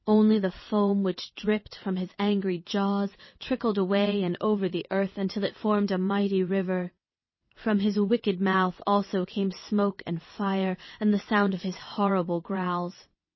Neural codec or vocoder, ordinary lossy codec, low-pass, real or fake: vocoder, 22.05 kHz, 80 mel bands, WaveNeXt; MP3, 24 kbps; 7.2 kHz; fake